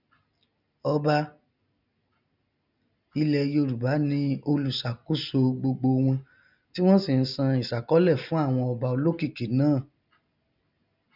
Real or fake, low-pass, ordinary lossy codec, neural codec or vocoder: real; 5.4 kHz; none; none